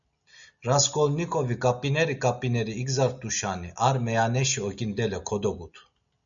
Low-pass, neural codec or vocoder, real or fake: 7.2 kHz; none; real